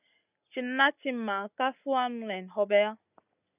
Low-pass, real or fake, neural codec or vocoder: 3.6 kHz; real; none